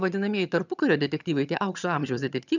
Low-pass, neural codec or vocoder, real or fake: 7.2 kHz; vocoder, 22.05 kHz, 80 mel bands, HiFi-GAN; fake